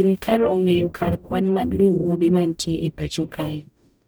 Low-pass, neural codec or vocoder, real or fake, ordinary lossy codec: none; codec, 44.1 kHz, 0.9 kbps, DAC; fake; none